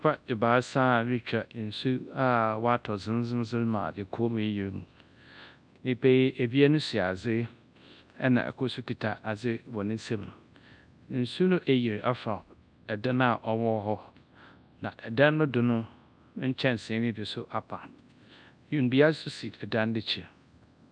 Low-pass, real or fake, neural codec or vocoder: 9.9 kHz; fake; codec, 24 kHz, 0.9 kbps, WavTokenizer, large speech release